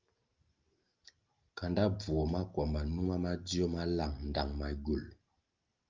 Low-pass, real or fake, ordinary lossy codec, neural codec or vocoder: 7.2 kHz; real; Opus, 24 kbps; none